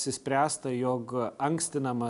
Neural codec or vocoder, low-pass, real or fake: none; 10.8 kHz; real